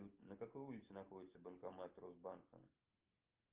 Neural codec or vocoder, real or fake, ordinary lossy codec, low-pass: none; real; Opus, 32 kbps; 3.6 kHz